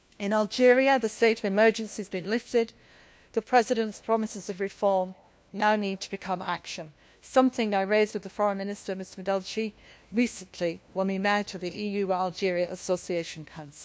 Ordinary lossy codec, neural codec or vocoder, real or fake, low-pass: none; codec, 16 kHz, 1 kbps, FunCodec, trained on LibriTTS, 50 frames a second; fake; none